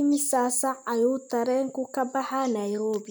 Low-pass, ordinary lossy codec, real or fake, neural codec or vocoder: none; none; real; none